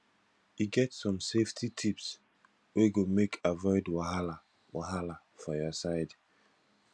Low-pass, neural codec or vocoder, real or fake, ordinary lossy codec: none; none; real; none